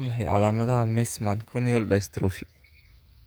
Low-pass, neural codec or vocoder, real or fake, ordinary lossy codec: none; codec, 44.1 kHz, 2.6 kbps, SNAC; fake; none